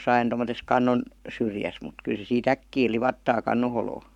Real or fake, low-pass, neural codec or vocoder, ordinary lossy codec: fake; 19.8 kHz; codec, 44.1 kHz, 7.8 kbps, Pupu-Codec; none